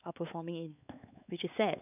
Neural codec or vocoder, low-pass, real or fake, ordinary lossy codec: codec, 16 kHz, 4 kbps, X-Codec, WavLM features, trained on Multilingual LibriSpeech; 3.6 kHz; fake; none